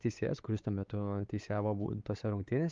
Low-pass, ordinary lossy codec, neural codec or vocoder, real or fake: 7.2 kHz; Opus, 32 kbps; codec, 16 kHz, 4 kbps, X-Codec, WavLM features, trained on Multilingual LibriSpeech; fake